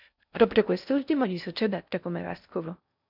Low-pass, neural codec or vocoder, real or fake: 5.4 kHz; codec, 16 kHz in and 24 kHz out, 0.6 kbps, FocalCodec, streaming, 4096 codes; fake